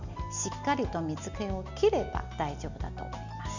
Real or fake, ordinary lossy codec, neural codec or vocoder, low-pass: real; none; none; 7.2 kHz